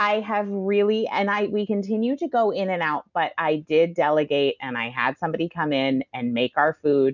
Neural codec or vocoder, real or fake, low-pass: none; real; 7.2 kHz